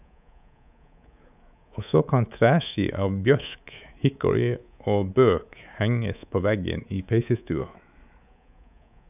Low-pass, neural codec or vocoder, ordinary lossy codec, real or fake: 3.6 kHz; codec, 24 kHz, 3.1 kbps, DualCodec; none; fake